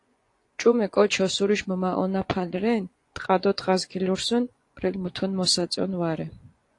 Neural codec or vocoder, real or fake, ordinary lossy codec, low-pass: vocoder, 24 kHz, 100 mel bands, Vocos; fake; AAC, 48 kbps; 10.8 kHz